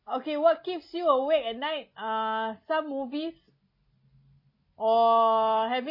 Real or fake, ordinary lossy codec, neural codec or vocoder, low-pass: real; MP3, 24 kbps; none; 5.4 kHz